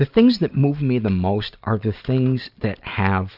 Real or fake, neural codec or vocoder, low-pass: real; none; 5.4 kHz